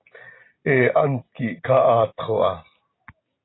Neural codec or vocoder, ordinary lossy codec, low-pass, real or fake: none; AAC, 16 kbps; 7.2 kHz; real